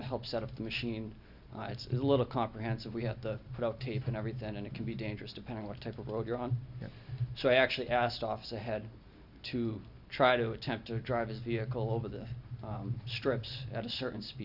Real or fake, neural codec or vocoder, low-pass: real; none; 5.4 kHz